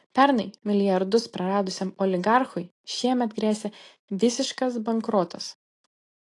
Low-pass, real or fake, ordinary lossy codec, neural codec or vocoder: 10.8 kHz; real; AAC, 48 kbps; none